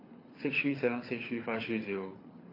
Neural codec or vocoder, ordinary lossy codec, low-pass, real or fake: codec, 24 kHz, 6 kbps, HILCodec; AAC, 24 kbps; 5.4 kHz; fake